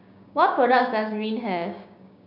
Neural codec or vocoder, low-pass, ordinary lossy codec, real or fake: codec, 16 kHz, 6 kbps, DAC; 5.4 kHz; none; fake